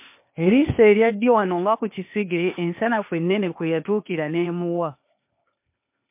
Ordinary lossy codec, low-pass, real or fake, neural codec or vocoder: MP3, 32 kbps; 3.6 kHz; fake; codec, 16 kHz, 0.8 kbps, ZipCodec